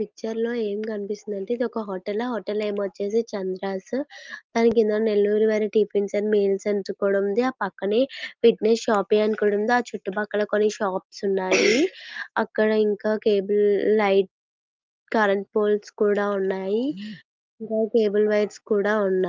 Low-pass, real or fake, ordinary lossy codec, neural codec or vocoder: 7.2 kHz; real; Opus, 32 kbps; none